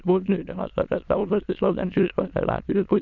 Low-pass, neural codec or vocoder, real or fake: 7.2 kHz; autoencoder, 22.05 kHz, a latent of 192 numbers a frame, VITS, trained on many speakers; fake